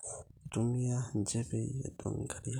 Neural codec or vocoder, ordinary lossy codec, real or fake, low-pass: vocoder, 44.1 kHz, 128 mel bands every 256 samples, BigVGAN v2; none; fake; 19.8 kHz